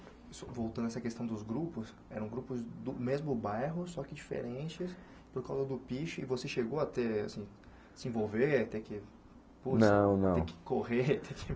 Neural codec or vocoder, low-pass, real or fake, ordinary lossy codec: none; none; real; none